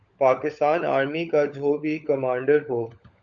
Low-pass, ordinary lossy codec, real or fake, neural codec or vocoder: 7.2 kHz; Opus, 64 kbps; fake; codec, 16 kHz, 16 kbps, FunCodec, trained on LibriTTS, 50 frames a second